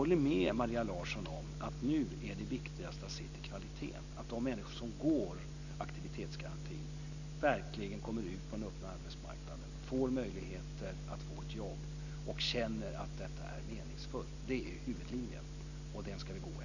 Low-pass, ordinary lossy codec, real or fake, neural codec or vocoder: 7.2 kHz; none; real; none